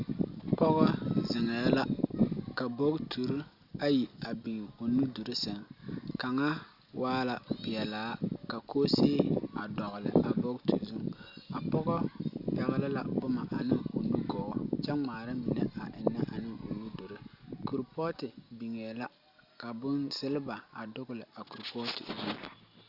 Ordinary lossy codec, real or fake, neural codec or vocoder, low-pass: Opus, 64 kbps; real; none; 5.4 kHz